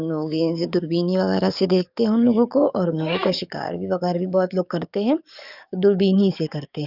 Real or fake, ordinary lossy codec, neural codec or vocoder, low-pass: fake; none; codec, 44.1 kHz, 7.8 kbps, DAC; 5.4 kHz